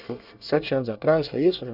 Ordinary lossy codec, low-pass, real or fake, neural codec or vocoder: none; 5.4 kHz; fake; codec, 24 kHz, 1 kbps, SNAC